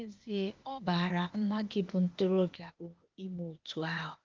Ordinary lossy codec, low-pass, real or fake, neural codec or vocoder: Opus, 32 kbps; 7.2 kHz; fake; codec, 16 kHz, 0.8 kbps, ZipCodec